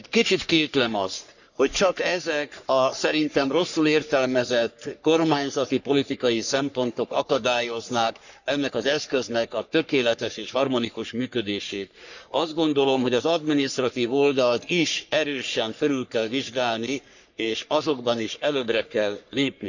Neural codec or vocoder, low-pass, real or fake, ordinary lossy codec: codec, 44.1 kHz, 3.4 kbps, Pupu-Codec; 7.2 kHz; fake; none